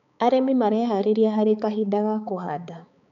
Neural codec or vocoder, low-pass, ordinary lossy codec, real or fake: codec, 16 kHz, 4 kbps, X-Codec, HuBERT features, trained on balanced general audio; 7.2 kHz; none; fake